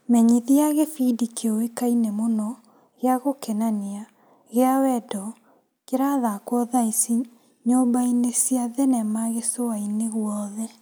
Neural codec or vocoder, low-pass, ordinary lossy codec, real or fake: none; none; none; real